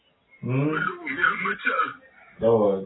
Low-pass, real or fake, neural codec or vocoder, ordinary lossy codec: 7.2 kHz; real; none; AAC, 16 kbps